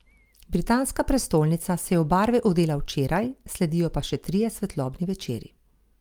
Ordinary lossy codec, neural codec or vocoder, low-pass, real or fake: Opus, 32 kbps; none; 19.8 kHz; real